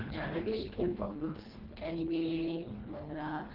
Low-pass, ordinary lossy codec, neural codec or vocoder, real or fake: 5.4 kHz; Opus, 16 kbps; codec, 24 kHz, 1.5 kbps, HILCodec; fake